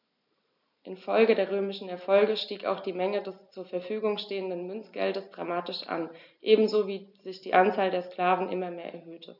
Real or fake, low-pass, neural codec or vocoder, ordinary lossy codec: real; 5.4 kHz; none; none